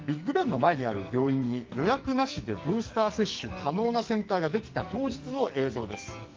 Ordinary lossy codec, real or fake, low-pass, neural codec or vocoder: Opus, 24 kbps; fake; 7.2 kHz; codec, 44.1 kHz, 2.6 kbps, SNAC